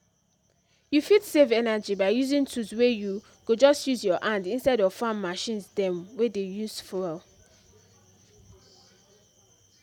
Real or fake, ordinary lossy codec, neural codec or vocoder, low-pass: real; none; none; 19.8 kHz